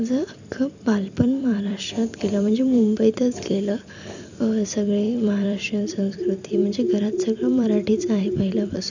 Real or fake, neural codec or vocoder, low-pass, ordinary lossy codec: fake; vocoder, 44.1 kHz, 128 mel bands every 256 samples, BigVGAN v2; 7.2 kHz; none